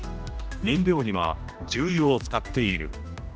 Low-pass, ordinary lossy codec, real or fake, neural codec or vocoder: none; none; fake; codec, 16 kHz, 1 kbps, X-Codec, HuBERT features, trained on general audio